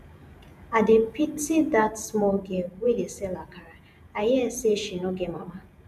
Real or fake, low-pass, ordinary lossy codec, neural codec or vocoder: fake; 14.4 kHz; none; vocoder, 48 kHz, 128 mel bands, Vocos